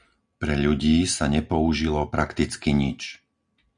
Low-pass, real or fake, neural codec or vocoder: 9.9 kHz; real; none